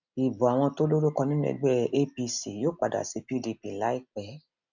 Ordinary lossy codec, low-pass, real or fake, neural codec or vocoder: none; 7.2 kHz; real; none